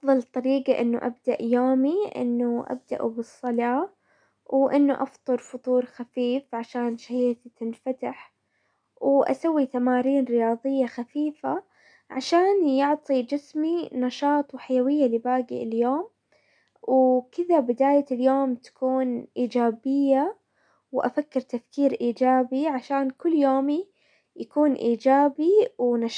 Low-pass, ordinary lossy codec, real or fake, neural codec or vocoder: 9.9 kHz; none; real; none